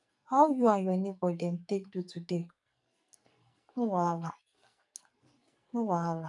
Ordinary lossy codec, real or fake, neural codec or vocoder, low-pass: MP3, 96 kbps; fake; codec, 44.1 kHz, 2.6 kbps, SNAC; 10.8 kHz